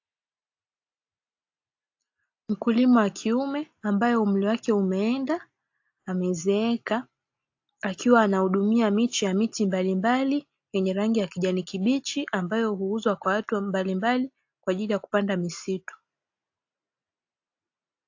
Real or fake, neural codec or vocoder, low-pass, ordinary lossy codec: real; none; 7.2 kHz; AAC, 48 kbps